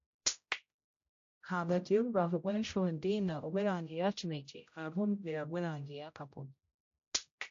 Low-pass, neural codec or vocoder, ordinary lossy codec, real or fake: 7.2 kHz; codec, 16 kHz, 0.5 kbps, X-Codec, HuBERT features, trained on general audio; AAC, 48 kbps; fake